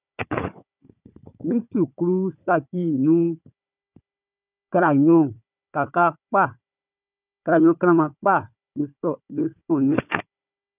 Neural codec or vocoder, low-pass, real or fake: codec, 16 kHz, 4 kbps, FunCodec, trained on Chinese and English, 50 frames a second; 3.6 kHz; fake